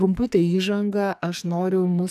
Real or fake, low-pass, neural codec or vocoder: fake; 14.4 kHz; codec, 44.1 kHz, 2.6 kbps, SNAC